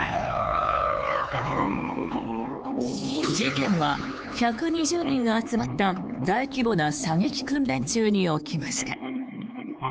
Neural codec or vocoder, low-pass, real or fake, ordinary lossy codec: codec, 16 kHz, 4 kbps, X-Codec, HuBERT features, trained on LibriSpeech; none; fake; none